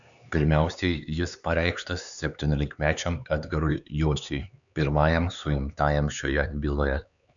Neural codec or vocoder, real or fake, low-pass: codec, 16 kHz, 4 kbps, X-Codec, HuBERT features, trained on LibriSpeech; fake; 7.2 kHz